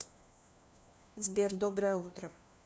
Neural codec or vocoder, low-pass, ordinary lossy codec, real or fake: codec, 16 kHz, 1 kbps, FunCodec, trained on LibriTTS, 50 frames a second; none; none; fake